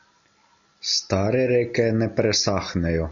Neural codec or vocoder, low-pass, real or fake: none; 7.2 kHz; real